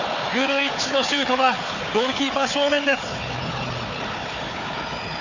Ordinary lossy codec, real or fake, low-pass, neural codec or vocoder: none; fake; 7.2 kHz; codec, 16 kHz, 4 kbps, FunCodec, trained on Chinese and English, 50 frames a second